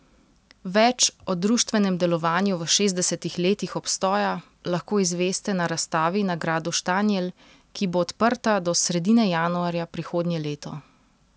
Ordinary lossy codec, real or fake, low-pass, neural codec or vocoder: none; real; none; none